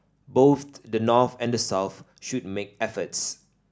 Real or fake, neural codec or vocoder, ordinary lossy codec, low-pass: real; none; none; none